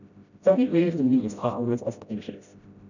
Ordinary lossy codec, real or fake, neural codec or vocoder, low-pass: none; fake; codec, 16 kHz, 0.5 kbps, FreqCodec, smaller model; 7.2 kHz